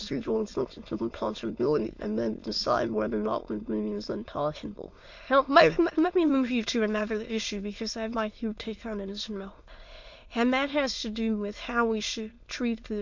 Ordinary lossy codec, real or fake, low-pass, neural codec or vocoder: MP3, 48 kbps; fake; 7.2 kHz; autoencoder, 22.05 kHz, a latent of 192 numbers a frame, VITS, trained on many speakers